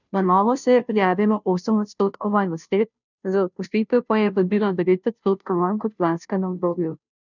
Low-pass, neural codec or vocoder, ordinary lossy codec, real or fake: 7.2 kHz; codec, 16 kHz, 0.5 kbps, FunCodec, trained on Chinese and English, 25 frames a second; none; fake